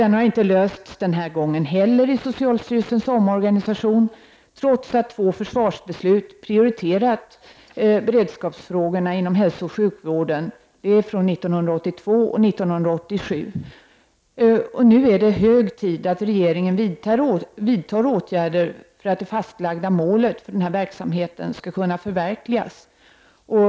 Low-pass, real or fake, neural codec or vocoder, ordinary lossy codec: none; real; none; none